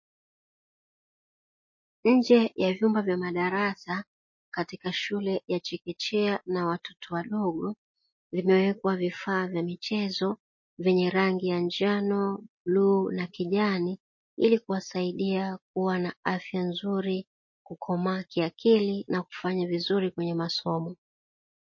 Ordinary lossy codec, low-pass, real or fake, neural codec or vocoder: MP3, 32 kbps; 7.2 kHz; real; none